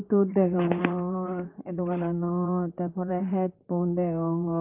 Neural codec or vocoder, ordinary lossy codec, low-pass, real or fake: vocoder, 44.1 kHz, 128 mel bands, Pupu-Vocoder; Opus, 32 kbps; 3.6 kHz; fake